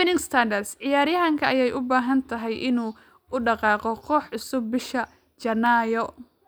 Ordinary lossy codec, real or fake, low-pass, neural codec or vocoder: none; real; none; none